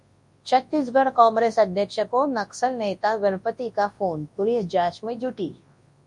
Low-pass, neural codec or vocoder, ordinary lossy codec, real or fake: 10.8 kHz; codec, 24 kHz, 0.9 kbps, WavTokenizer, large speech release; MP3, 48 kbps; fake